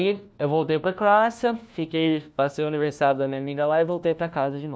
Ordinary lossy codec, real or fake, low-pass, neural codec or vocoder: none; fake; none; codec, 16 kHz, 1 kbps, FunCodec, trained on LibriTTS, 50 frames a second